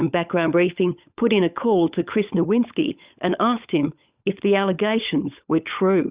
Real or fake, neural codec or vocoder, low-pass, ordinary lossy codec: fake; codec, 16 kHz, 16 kbps, FunCodec, trained on LibriTTS, 50 frames a second; 3.6 kHz; Opus, 64 kbps